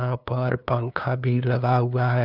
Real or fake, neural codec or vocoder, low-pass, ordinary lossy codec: fake; codec, 16 kHz, 2 kbps, FunCodec, trained on LibriTTS, 25 frames a second; 5.4 kHz; none